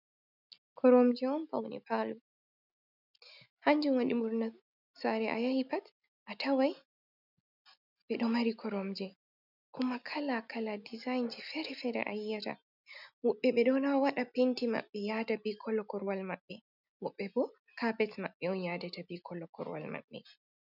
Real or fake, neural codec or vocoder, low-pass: real; none; 5.4 kHz